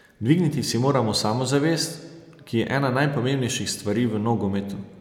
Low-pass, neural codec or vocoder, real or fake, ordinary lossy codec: 19.8 kHz; none; real; none